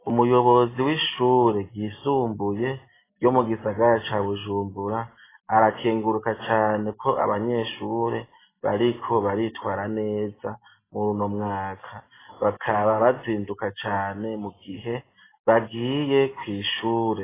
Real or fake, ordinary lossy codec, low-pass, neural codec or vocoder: real; AAC, 16 kbps; 3.6 kHz; none